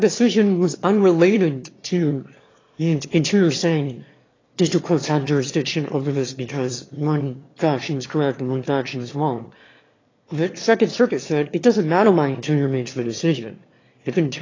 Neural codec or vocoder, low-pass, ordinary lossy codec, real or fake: autoencoder, 22.05 kHz, a latent of 192 numbers a frame, VITS, trained on one speaker; 7.2 kHz; AAC, 32 kbps; fake